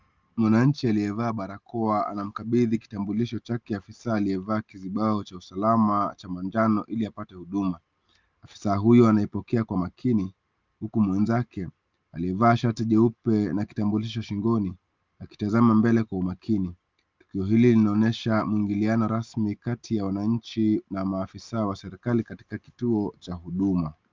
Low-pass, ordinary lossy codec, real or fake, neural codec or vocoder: 7.2 kHz; Opus, 32 kbps; real; none